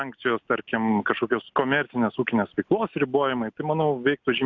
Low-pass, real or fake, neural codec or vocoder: 7.2 kHz; real; none